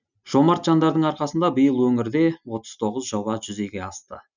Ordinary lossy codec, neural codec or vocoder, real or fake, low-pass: none; none; real; 7.2 kHz